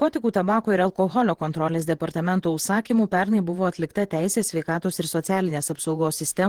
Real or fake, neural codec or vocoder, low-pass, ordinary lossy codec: fake; vocoder, 48 kHz, 128 mel bands, Vocos; 19.8 kHz; Opus, 16 kbps